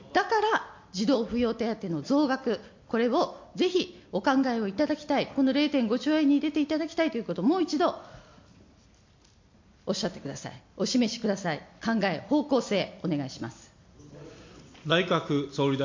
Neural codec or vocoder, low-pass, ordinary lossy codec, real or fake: none; 7.2 kHz; MP3, 48 kbps; real